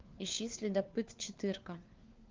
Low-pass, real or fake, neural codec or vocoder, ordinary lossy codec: 7.2 kHz; fake; codec, 16 kHz, 4 kbps, FunCodec, trained on LibriTTS, 50 frames a second; Opus, 24 kbps